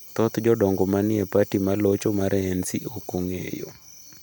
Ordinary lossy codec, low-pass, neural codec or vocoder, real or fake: none; none; none; real